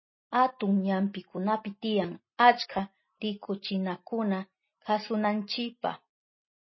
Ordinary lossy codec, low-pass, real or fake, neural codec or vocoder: MP3, 24 kbps; 7.2 kHz; real; none